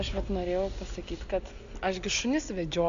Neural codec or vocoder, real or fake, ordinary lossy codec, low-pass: none; real; AAC, 64 kbps; 7.2 kHz